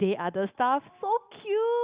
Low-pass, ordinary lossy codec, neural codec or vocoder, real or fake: 3.6 kHz; Opus, 64 kbps; none; real